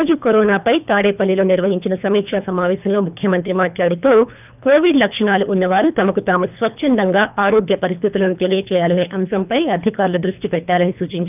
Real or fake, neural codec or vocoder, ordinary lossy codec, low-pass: fake; codec, 24 kHz, 3 kbps, HILCodec; none; 3.6 kHz